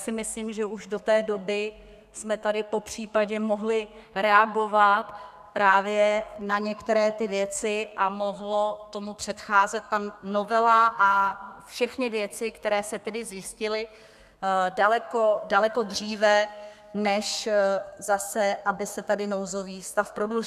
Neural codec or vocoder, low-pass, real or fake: codec, 32 kHz, 1.9 kbps, SNAC; 14.4 kHz; fake